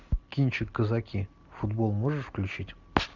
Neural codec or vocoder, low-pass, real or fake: none; 7.2 kHz; real